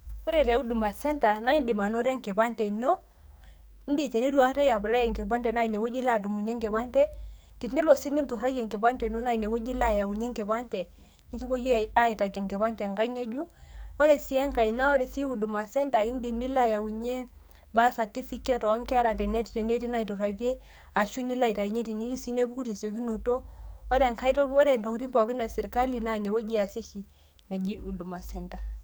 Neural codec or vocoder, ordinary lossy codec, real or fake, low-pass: codec, 44.1 kHz, 2.6 kbps, SNAC; none; fake; none